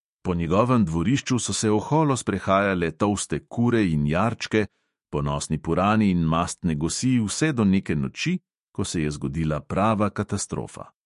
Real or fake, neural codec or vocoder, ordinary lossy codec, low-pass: fake; autoencoder, 48 kHz, 128 numbers a frame, DAC-VAE, trained on Japanese speech; MP3, 48 kbps; 14.4 kHz